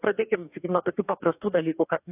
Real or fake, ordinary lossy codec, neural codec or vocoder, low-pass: fake; AAC, 24 kbps; codec, 44.1 kHz, 2.6 kbps, DAC; 3.6 kHz